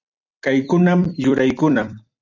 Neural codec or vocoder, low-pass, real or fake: vocoder, 44.1 kHz, 128 mel bands every 256 samples, BigVGAN v2; 7.2 kHz; fake